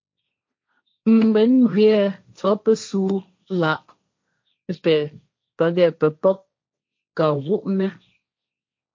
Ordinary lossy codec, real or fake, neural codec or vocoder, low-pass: MP3, 48 kbps; fake; codec, 16 kHz, 1.1 kbps, Voila-Tokenizer; 7.2 kHz